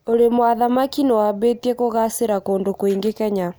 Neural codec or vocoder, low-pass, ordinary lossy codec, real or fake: none; none; none; real